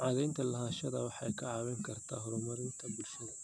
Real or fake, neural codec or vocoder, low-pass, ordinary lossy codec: real; none; none; none